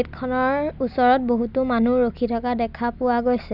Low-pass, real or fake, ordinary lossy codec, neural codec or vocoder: 5.4 kHz; real; none; none